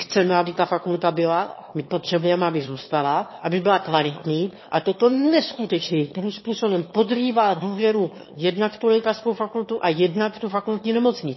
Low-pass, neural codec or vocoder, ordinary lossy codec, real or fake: 7.2 kHz; autoencoder, 22.05 kHz, a latent of 192 numbers a frame, VITS, trained on one speaker; MP3, 24 kbps; fake